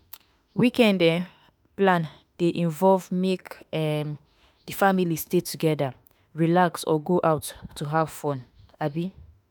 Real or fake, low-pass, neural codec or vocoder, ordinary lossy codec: fake; none; autoencoder, 48 kHz, 32 numbers a frame, DAC-VAE, trained on Japanese speech; none